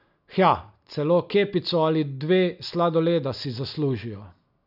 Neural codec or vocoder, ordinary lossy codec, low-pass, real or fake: none; none; 5.4 kHz; real